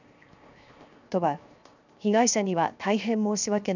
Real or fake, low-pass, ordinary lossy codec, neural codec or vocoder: fake; 7.2 kHz; none; codec, 16 kHz, 0.7 kbps, FocalCodec